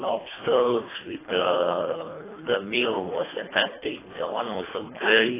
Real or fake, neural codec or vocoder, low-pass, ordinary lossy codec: fake; codec, 24 kHz, 1.5 kbps, HILCodec; 3.6 kHz; AAC, 16 kbps